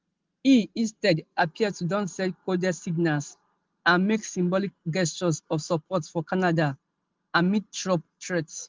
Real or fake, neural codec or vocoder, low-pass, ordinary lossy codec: real; none; 7.2 kHz; Opus, 32 kbps